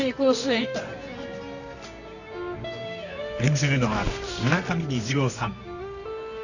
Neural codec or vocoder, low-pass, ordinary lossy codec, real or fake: codec, 24 kHz, 0.9 kbps, WavTokenizer, medium music audio release; 7.2 kHz; none; fake